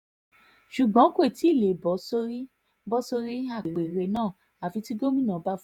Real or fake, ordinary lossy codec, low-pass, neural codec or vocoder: fake; none; 19.8 kHz; vocoder, 48 kHz, 128 mel bands, Vocos